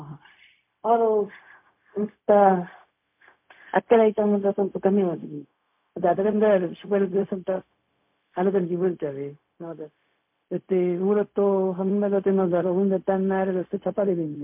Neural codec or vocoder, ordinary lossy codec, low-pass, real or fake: codec, 16 kHz, 0.4 kbps, LongCat-Audio-Codec; MP3, 32 kbps; 3.6 kHz; fake